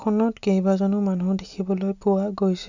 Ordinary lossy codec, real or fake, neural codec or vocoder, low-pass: none; real; none; 7.2 kHz